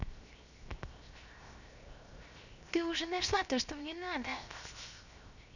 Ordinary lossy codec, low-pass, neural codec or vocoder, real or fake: none; 7.2 kHz; codec, 16 kHz, 0.7 kbps, FocalCodec; fake